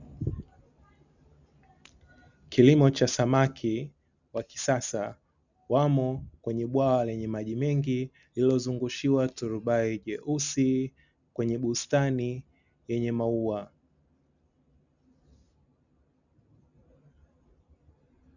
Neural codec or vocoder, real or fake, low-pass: none; real; 7.2 kHz